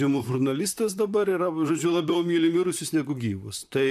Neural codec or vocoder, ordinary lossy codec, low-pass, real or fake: vocoder, 44.1 kHz, 128 mel bands, Pupu-Vocoder; MP3, 96 kbps; 14.4 kHz; fake